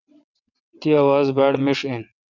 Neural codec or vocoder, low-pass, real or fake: vocoder, 22.05 kHz, 80 mel bands, WaveNeXt; 7.2 kHz; fake